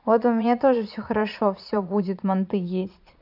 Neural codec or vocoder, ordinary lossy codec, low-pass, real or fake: vocoder, 22.05 kHz, 80 mel bands, Vocos; none; 5.4 kHz; fake